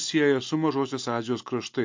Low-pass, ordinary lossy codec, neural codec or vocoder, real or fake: 7.2 kHz; MP3, 48 kbps; none; real